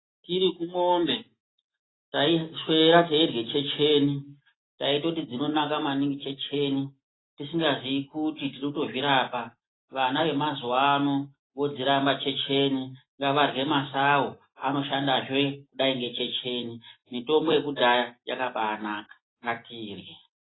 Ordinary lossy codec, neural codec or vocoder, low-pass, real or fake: AAC, 16 kbps; none; 7.2 kHz; real